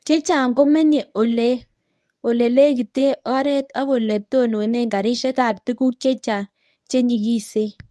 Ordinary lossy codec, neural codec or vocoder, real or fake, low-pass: none; codec, 24 kHz, 0.9 kbps, WavTokenizer, medium speech release version 1; fake; none